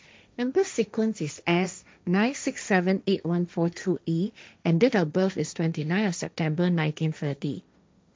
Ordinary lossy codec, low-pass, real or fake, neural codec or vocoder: none; none; fake; codec, 16 kHz, 1.1 kbps, Voila-Tokenizer